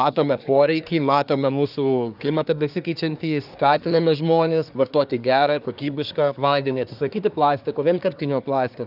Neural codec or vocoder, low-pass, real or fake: codec, 24 kHz, 1 kbps, SNAC; 5.4 kHz; fake